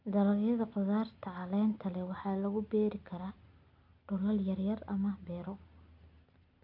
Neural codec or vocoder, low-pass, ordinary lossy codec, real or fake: none; 5.4 kHz; none; real